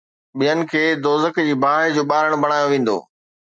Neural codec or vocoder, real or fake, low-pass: none; real; 9.9 kHz